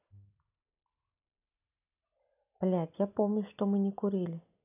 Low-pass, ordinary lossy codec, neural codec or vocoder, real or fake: 3.6 kHz; none; none; real